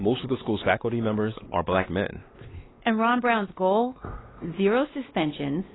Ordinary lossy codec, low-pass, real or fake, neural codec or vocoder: AAC, 16 kbps; 7.2 kHz; fake; codec, 16 kHz, 2 kbps, X-Codec, WavLM features, trained on Multilingual LibriSpeech